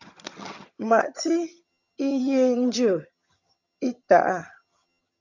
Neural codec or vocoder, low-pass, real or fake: vocoder, 22.05 kHz, 80 mel bands, HiFi-GAN; 7.2 kHz; fake